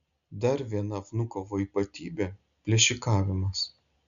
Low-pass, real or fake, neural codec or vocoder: 7.2 kHz; real; none